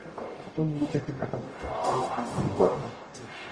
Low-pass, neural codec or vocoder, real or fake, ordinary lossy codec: 9.9 kHz; codec, 44.1 kHz, 0.9 kbps, DAC; fake; Opus, 24 kbps